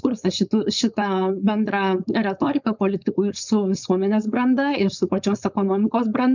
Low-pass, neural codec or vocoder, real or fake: 7.2 kHz; codec, 16 kHz, 4.8 kbps, FACodec; fake